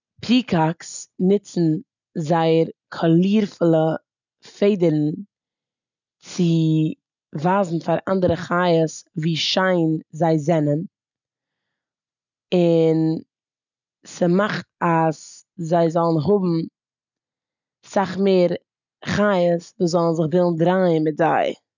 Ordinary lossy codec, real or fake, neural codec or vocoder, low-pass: none; real; none; 7.2 kHz